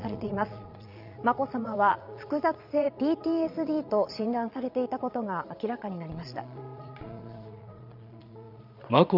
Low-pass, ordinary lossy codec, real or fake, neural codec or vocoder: 5.4 kHz; none; fake; vocoder, 22.05 kHz, 80 mel bands, Vocos